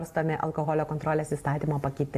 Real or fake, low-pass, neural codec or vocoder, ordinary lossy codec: real; 14.4 kHz; none; AAC, 64 kbps